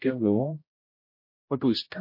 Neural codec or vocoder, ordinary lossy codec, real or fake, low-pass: codec, 16 kHz, 0.5 kbps, X-Codec, HuBERT features, trained on general audio; MP3, 32 kbps; fake; 5.4 kHz